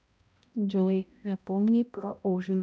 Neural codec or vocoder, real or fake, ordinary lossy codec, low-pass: codec, 16 kHz, 0.5 kbps, X-Codec, HuBERT features, trained on balanced general audio; fake; none; none